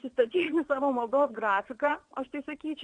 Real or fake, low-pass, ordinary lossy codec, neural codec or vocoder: fake; 9.9 kHz; Opus, 64 kbps; vocoder, 22.05 kHz, 80 mel bands, WaveNeXt